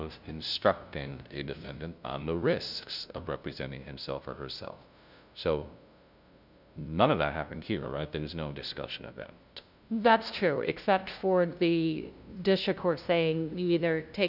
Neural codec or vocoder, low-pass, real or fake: codec, 16 kHz, 0.5 kbps, FunCodec, trained on LibriTTS, 25 frames a second; 5.4 kHz; fake